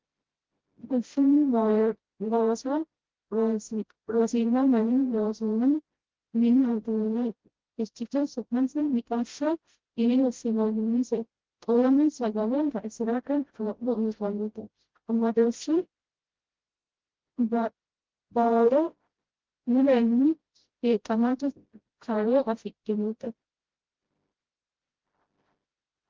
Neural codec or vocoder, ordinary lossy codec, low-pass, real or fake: codec, 16 kHz, 0.5 kbps, FreqCodec, smaller model; Opus, 16 kbps; 7.2 kHz; fake